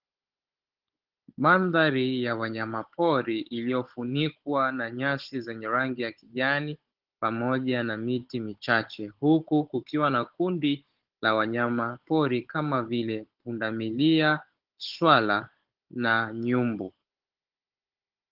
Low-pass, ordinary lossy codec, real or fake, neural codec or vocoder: 5.4 kHz; Opus, 16 kbps; fake; codec, 16 kHz, 16 kbps, FunCodec, trained on Chinese and English, 50 frames a second